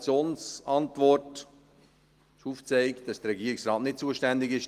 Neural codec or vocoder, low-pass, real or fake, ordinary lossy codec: none; 14.4 kHz; real; Opus, 24 kbps